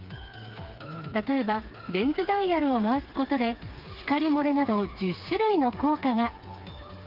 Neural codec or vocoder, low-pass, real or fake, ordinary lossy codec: codec, 16 kHz, 4 kbps, FreqCodec, smaller model; 5.4 kHz; fake; Opus, 32 kbps